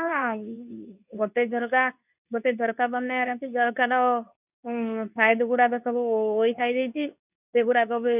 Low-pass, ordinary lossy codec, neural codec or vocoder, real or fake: 3.6 kHz; none; codec, 24 kHz, 0.9 kbps, WavTokenizer, medium speech release version 2; fake